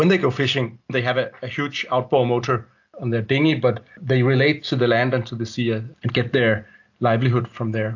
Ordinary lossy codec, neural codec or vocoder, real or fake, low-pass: AAC, 48 kbps; none; real; 7.2 kHz